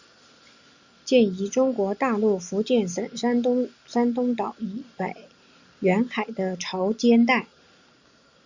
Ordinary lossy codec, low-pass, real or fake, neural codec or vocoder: Opus, 64 kbps; 7.2 kHz; real; none